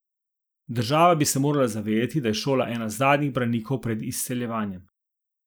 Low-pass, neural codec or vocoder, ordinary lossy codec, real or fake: none; none; none; real